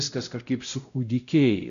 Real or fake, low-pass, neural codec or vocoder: fake; 7.2 kHz; codec, 16 kHz, 0.5 kbps, X-Codec, WavLM features, trained on Multilingual LibriSpeech